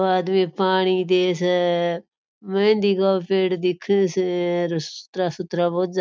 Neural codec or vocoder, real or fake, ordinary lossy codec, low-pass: none; real; none; none